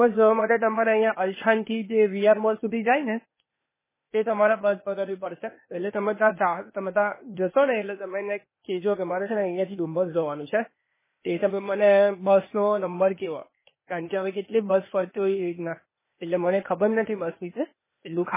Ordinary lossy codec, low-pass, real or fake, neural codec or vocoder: MP3, 16 kbps; 3.6 kHz; fake; codec, 16 kHz, 0.8 kbps, ZipCodec